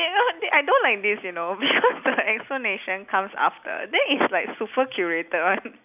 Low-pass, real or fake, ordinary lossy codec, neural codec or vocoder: 3.6 kHz; real; none; none